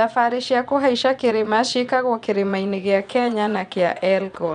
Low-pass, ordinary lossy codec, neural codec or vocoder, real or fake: 9.9 kHz; none; vocoder, 22.05 kHz, 80 mel bands, Vocos; fake